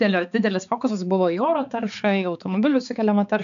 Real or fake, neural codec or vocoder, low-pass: fake; codec, 16 kHz, 4 kbps, X-Codec, HuBERT features, trained on balanced general audio; 7.2 kHz